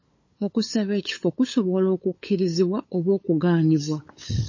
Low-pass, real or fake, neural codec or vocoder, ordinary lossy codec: 7.2 kHz; fake; codec, 16 kHz, 8 kbps, FunCodec, trained on LibriTTS, 25 frames a second; MP3, 32 kbps